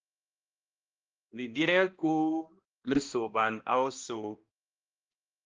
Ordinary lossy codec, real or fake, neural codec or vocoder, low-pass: Opus, 24 kbps; fake; codec, 16 kHz, 1 kbps, X-Codec, HuBERT features, trained on balanced general audio; 7.2 kHz